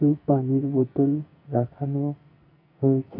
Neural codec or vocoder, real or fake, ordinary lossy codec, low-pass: codec, 44.1 kHz, 2.6 kbps, SNAC; fake; AAC, 48 kbps; 5.4 kHz